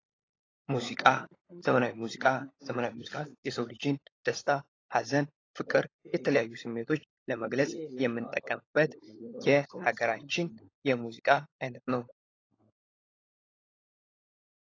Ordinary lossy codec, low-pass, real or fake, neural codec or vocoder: AAC, 32 kbps; 7.2 kHz; fake; codec, 16 kHz, 16 kbps, FunCodec, trained on LibriTTS, 50 frames a second